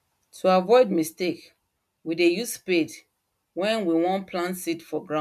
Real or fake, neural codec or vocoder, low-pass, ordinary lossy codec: real; none; 14.4 kHz; MP3, 96 kbps